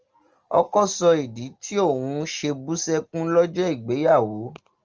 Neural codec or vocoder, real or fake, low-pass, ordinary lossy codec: none; real; 7.2 kHz; Opus, 24 kbps